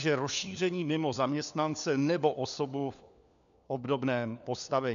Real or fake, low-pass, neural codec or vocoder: fake; 7.2 kHz; codec, 16 kHz, 4 kbps, FunCodec, trained on LibriTTS, 50 frames a second